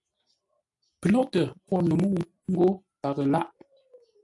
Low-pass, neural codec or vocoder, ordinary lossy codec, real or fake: 10.8 kHz; none; AAC, 64 kbps; real